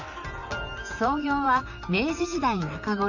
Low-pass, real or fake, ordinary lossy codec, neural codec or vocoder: 7.2 kHz; fake; none; vocoder, 44.1 kHz, 128 mel bands, Pupu-Vocoder